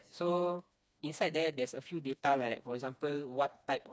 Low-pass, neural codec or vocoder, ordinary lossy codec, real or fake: none; codec, 16 kHz, 2 kbps, FreqCodec, smaller model; none; fake